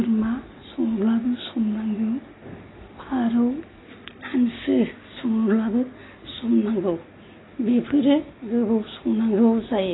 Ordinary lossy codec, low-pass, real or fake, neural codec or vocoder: AAC, 16 kbps; 7.2 kHz; real; none